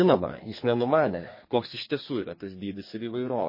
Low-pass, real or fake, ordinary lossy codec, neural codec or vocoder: 5.4 kHz; fake; MP3, 24 kbps; codec, 32 kHz, 1.9 kbps, SNAC